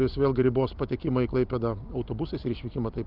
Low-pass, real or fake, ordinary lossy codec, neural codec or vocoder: 5.4 kHz; real; Opus, 32 kbps; none